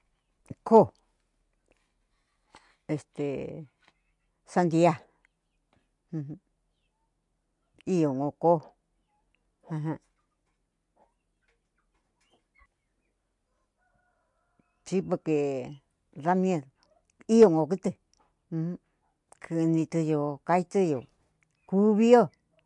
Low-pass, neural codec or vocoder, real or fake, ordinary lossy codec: 10.8 kHz; none; real; MP3, 64 kbps